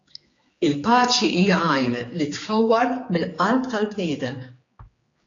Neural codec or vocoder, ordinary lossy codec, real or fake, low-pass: codec, 16 kHz, 4 kbps, X-Codec, HuBERT features, trained on general audio; AAC, 32 kbps; fake; 7.2 kHz